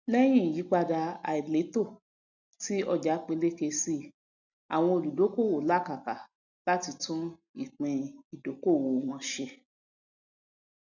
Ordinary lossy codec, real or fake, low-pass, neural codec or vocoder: none; real; 7.2 kHz; none